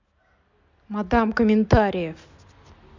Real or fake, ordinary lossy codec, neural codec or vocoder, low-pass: real; MP3, 64 kbps; none; 7.2 kHz